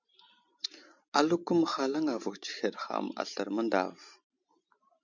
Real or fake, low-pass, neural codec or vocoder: fake; 7.2 kHz; vocoder, 44.1 kHz, 128 mel bands every 512 samples, BigVGAN v2